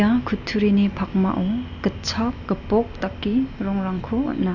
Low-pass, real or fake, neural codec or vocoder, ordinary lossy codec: 7.2 kHz; real; none; none